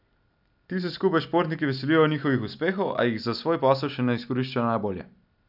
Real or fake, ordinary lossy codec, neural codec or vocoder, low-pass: real; none; none; 5.4 kHz